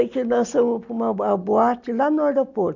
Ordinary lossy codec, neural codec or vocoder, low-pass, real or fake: none; none; 7.2 kHz; real